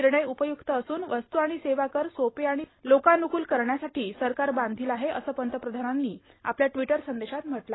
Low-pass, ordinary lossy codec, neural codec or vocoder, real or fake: 7.2 kHz; AAC, 16 kbps; none; real